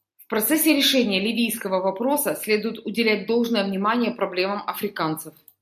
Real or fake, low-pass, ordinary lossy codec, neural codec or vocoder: real; 14.4 kHz; AAC, 96 kbps; none